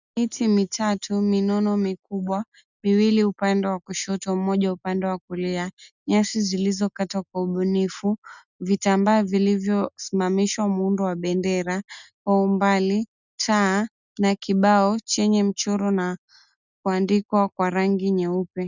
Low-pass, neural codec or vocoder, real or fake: 7.2 kHz; none; real